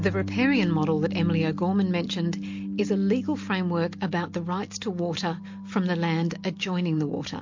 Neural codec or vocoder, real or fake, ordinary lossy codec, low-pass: none; real; MP3, 48 kbps; 7.2 kHz